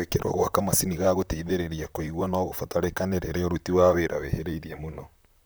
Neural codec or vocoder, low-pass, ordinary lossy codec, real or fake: vocoder, 44.1 kHz, 128 mel bands, Pupu-Vocoder; none; none; fake